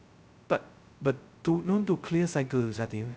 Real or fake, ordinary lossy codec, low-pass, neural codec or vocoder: fake; none; none; codec, 16 kHz, 0.2 kbps, FocalCodec